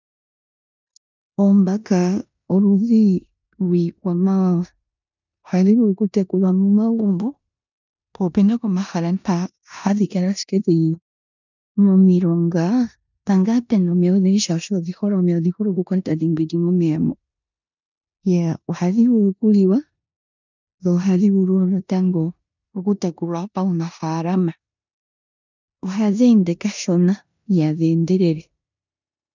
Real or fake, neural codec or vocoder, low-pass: fake; codec, 16 kHz in and 24 kHz out, 0.9 kbps, LongCat-Audio-Codec, four codebook decoder; 7.2 kHz